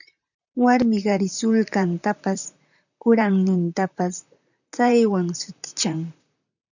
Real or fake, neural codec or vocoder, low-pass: fake; vocoder, 44.1 kHz, 128 mel bands, Pupu-Vocoder; 7.2 kHz